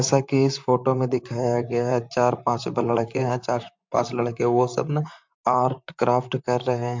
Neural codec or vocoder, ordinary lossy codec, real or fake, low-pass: vocoder, 44.1 kHz, 128 mel bands, Pupu-Vocoder; MP3, 64 kbps; fake; 7.2 kHz